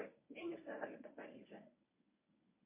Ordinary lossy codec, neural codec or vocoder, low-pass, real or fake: MP3, 24 kbps; codec, 24 kHz, 0.9 kbps, WavTokenizer, medium speech release version 1; 3.6 kHz; fake